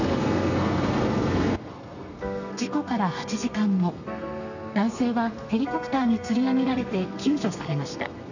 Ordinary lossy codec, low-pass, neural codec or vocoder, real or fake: none; 7.2 kHz; codec, 32 kHz, 1.9 kbps, SNAC; fake